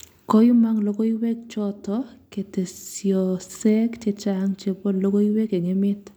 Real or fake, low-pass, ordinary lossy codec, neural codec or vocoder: real; none; none; none